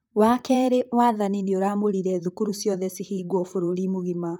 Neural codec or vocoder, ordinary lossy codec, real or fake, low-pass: vocoder, 44.1 kHz, 128 mel bands, Pupu-Vocoder; none; fake; none